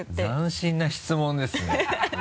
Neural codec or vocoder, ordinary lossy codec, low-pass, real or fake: none; none; none; real